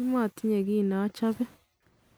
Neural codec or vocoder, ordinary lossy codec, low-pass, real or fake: none; none; none; real